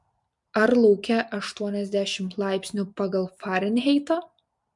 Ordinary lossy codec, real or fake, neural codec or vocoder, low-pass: MP3, 64 kbps; real; none; 10.8 kHz